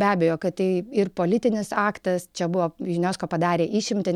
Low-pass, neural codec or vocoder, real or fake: 19.8 kHz; none; real